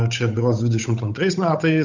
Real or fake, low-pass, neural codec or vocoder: fake; 7.2 kHz; codec, 16 kHz, 8 kbps, FunCodec, trained on Chinese and English, 25 frames a second